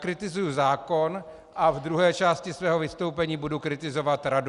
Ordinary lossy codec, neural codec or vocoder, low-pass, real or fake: Opus, 64 kbps; none; 10.8 kHz; real